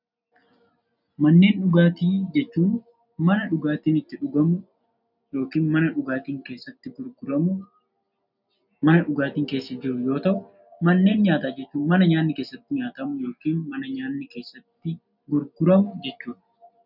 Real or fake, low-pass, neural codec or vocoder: real; 5.4 kHz; none